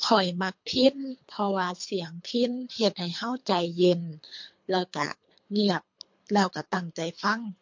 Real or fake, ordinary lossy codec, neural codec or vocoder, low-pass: fake; MP3, 48 kbps; codec, 24 kHz, 3 kbps, HILCodec; 7.2 kHz